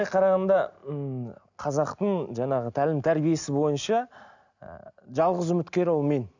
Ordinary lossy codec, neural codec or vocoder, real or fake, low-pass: none; none; real; 7.2 kHz